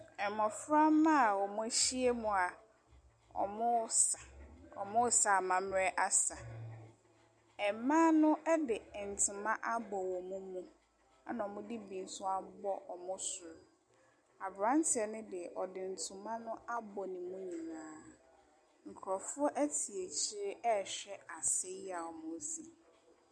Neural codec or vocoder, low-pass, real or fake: none; 9.9 kHz; real